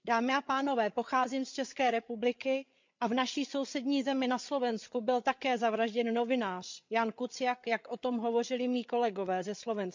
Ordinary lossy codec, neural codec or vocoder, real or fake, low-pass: MP3, 64 kbps; codec, 16 kHz, 8 kbps, FreqCodec, larger model; fake; 7.2 kHz